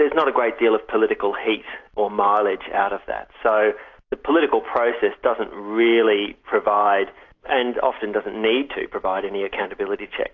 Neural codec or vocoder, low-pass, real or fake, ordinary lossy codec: none; 7.2 kHz; real; AAC, 48 kbps